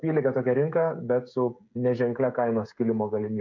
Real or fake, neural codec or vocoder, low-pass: fake; codec, 16 kHz, 16 kbps, FreqCodec, smaller model; 7.2 kHz